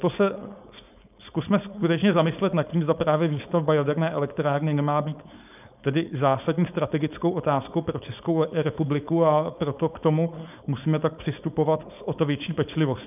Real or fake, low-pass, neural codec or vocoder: fake; 3.6 kHz; codec, 16 kHz, 4.8 kbps, FACodec